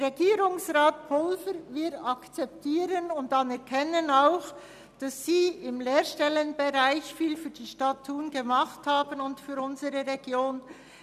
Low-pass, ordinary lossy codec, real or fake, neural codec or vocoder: 14.4 kHz; none; real; none